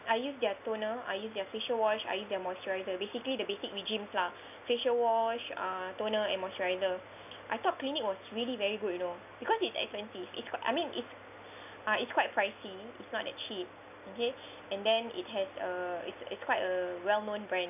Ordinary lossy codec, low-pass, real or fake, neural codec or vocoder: none; 3.6 kHz; real; none